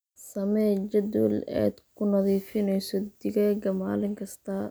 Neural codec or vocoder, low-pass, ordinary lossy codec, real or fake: none; none; none; real